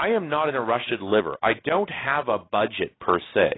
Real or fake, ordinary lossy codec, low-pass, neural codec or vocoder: real; AAC, 16 kbps; 7.2 kHz; none